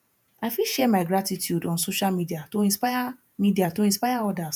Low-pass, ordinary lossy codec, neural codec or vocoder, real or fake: none; none; vocoder, 48 kHz, 128 mel bands, Vocos; fake